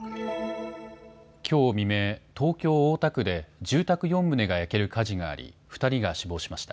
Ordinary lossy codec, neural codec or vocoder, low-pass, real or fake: none; none; none; real